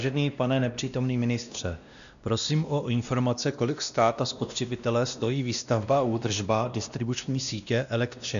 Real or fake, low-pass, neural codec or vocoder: fake; 7.2 kHz; codec, 16 kHz, 1 kbps, X-Codec, WavLM features, trained on Multilingual LibriSpeech